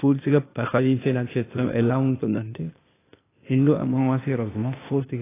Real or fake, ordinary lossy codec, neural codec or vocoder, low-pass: fake; AAC, 16 kbps; codec, 16 kHz, 0.8 kbps, ZipCodec; 3.6 kHz